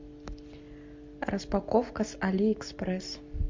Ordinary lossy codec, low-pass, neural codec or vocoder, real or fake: MP3, 48 kbps; 7.2 kHz; none; real